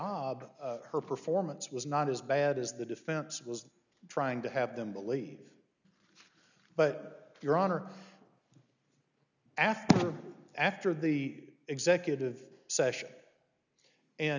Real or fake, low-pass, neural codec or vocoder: real; 7.2 kHz; none